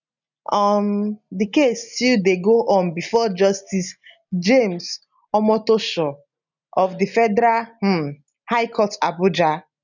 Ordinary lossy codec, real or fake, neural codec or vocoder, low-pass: none; real; none; 7.2 kHz